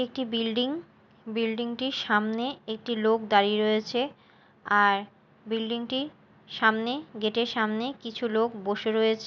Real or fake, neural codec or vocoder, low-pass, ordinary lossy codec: real; none; 7.2 kHz; none